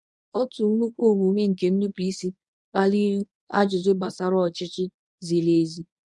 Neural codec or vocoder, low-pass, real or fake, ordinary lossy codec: codec, 24 kHz, 0.9 kbps, WavTokenizer, medium speech release version 1; 10.8 kHz; fake; none